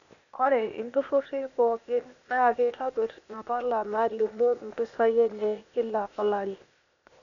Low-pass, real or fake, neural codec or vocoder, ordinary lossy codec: 7.2 kHz; fake; codec, 16 kHz, 0.8 kbps, ZipCodec; none